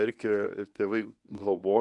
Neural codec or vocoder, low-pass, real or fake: codec, 24 kHz, 0.9 kbps, WavTokenizer, medium speech release version 1; 10.8 kHz; fake